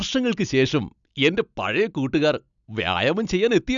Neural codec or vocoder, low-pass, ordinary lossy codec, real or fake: none; 7.2 kHz; none; real